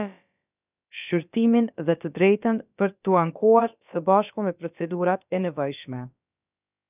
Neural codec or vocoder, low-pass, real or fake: codec, 16 kHz, about 1 kbps, DyCAST, with the encoder's durations; 3.6 kHz; fake